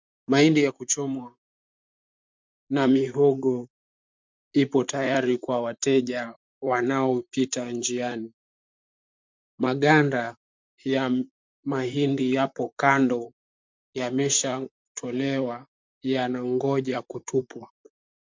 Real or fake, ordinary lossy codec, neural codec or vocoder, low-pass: fake; MP3, 64 kbps; vocoder, 44.1 kHz, 128 mel bands, Pupu-Vocoder; 7.2 kHz